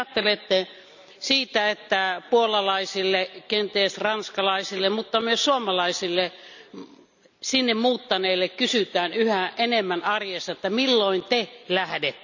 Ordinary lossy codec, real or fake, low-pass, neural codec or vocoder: none; real; 7.2 kHz; none